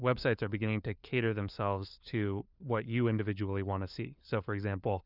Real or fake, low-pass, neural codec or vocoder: fake; 5.4 kHz; codec, 16 kHz, 4 kbps, FunCodec, trained on LibriTTS, 50 frames a second